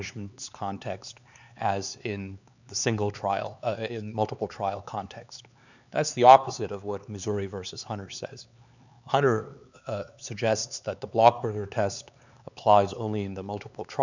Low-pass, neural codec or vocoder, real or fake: 7.2 kHz; codec, 16 kHz, 4 kbps, X-Codec, HuBERT features, trained on LibriSpeech; fake